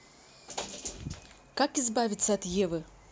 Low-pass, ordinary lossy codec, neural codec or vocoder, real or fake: none; none; none; real